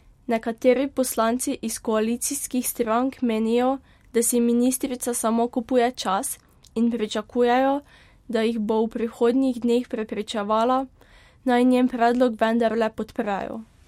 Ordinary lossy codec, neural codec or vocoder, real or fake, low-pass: MP3, 64 kbps; none; real; 19.8 kHz